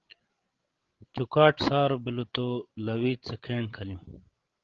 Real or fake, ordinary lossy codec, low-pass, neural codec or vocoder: fake; Opus, 16 kbps; 7.2 kHz; codec, 16 kHz, 16 kbps, FreqCodec, larger model